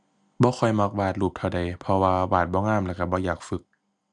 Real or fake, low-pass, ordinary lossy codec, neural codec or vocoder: real; 10.8 kHz; none; none